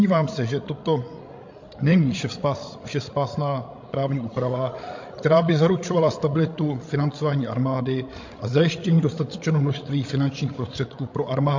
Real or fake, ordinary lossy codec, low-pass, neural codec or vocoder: fake; MP3, 48 kbps; 7.2 kHz; codec, 16 kHz, 16 kbps, FreqCodec, larger model